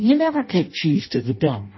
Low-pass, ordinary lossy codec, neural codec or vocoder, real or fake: 7.2 kHz; MP3, 24 kbps; codec, 16 kHz in and 24 kHz out, 0.6 kbps, FireRedTTS-2 codec; fake